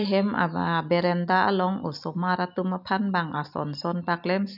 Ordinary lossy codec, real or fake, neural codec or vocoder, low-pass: none; real; none; 5.4 kHz